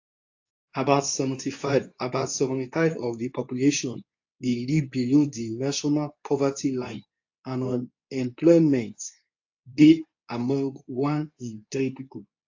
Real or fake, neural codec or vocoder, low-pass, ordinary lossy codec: fake; codec, 24 kHz, 0.9 kbps, WavTokenizer, medium speech release version 2; 7.2 kHz; AAC, 48 kbps